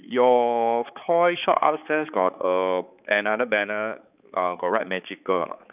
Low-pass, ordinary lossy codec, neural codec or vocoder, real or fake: 3.6 kHz; none; codec, 16 kHz, 4 kbps, X-Codec, HuBERT features, trained on balanced general audio; fake